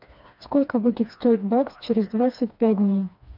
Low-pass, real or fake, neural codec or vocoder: 5.4 kHz; fake; codec, 16 kHz, 2 kbps, FreqCodec, smaller model